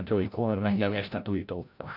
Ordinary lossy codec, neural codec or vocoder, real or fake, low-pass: none; codec, 16 kHz, 0.5 kbps, FreqCodec, larger model; fake; 5.4 kHz